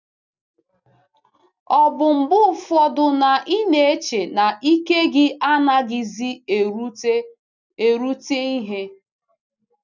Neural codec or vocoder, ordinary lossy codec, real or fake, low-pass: none; none; real; 7.2 kHz